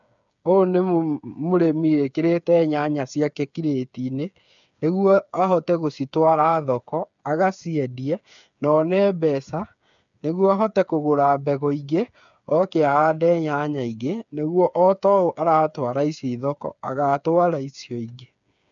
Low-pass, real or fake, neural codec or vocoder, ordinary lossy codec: 7.2 kHz; fake; codec, 16 kHz, 8 kbps, FreqCodec, smaller model; MP3, 96 kbps